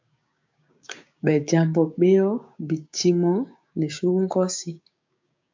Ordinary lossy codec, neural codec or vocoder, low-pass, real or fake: MP3, 64 kbps; codec, 16 kHz, 6 kbps, DAC; 7.2 kHz; fake